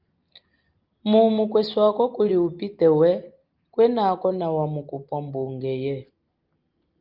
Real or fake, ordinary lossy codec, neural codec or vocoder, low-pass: real; Opus, 32 kbps; none; 5.4 kHz